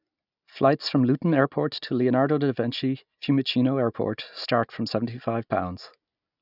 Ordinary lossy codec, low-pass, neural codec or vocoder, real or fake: none; 5.4 kHz; none; real